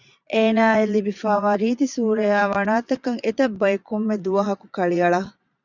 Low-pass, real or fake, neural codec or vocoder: 7.2 kHz; fake; vocoder, 22.05 kHz, 80 mel bands, Vocos